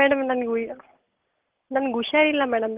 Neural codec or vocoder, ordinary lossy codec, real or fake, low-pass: none; Opus, 16 kbps; real; 3.6 kHz